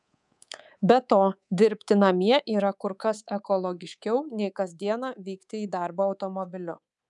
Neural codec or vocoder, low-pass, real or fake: codec, 24 kHz, 3.1 kbps, DualCodec; 10.8 kHz; fake